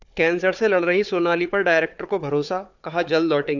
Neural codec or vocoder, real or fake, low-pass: codec, 16 kHz, 4 kbps, FunCodec, trained on Chinese and English, 50 frames a second; fake; 7.2 kHz